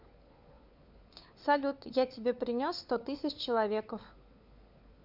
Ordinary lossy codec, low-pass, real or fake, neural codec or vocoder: none; 5.4 kHz; fake; codec, 16 kHz, 4 kbps, FunCodec, trained on LibriTTS, 50 frames a second